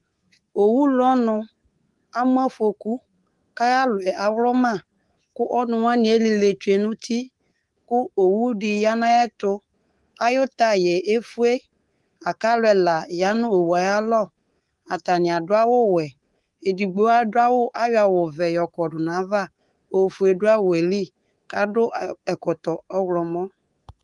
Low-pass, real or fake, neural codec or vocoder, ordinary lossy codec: 10.8 kHz; fake; codec, 24 kHz, 3.1 kbps, DualCodec; Opus, 24 kbps